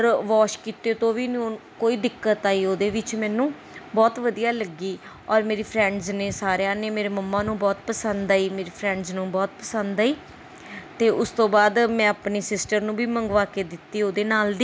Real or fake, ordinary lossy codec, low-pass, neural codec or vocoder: real; none; none; none